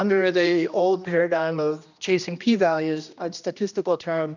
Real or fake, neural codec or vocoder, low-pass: fake; codec, 16 kHz, 1 kbps, X-Codec, HuBERT features, trained on general audio; 7.2 kHz